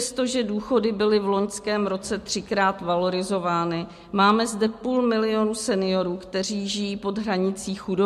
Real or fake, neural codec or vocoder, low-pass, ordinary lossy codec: real; none; 14.4 kHz; MP3, 64 kbps